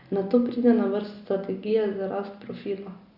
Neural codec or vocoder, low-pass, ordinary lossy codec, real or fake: none; 5.4 kHz; Opus, 64 kbps; real